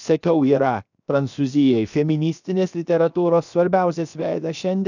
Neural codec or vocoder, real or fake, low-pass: codec, 16 kHz, 0.7 kbps, FocalCodec; fake; 7.2 kHz